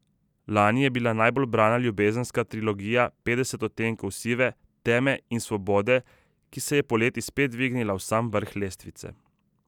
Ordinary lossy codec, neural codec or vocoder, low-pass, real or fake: none; none; 19.8 kHz; real